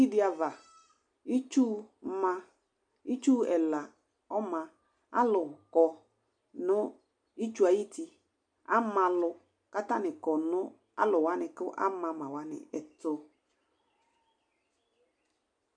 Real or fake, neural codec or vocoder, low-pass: real; none; 9.9 kHz